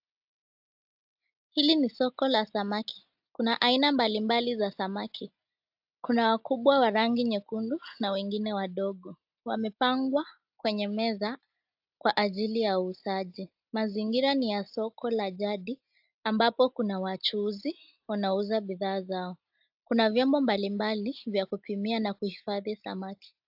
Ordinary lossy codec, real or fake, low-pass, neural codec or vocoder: AAC, 48 kbps; real; 5.4 kHz; none